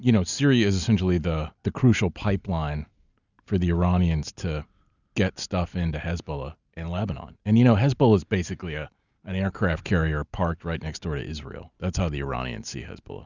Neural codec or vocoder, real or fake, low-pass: none; real; 7.2 kHz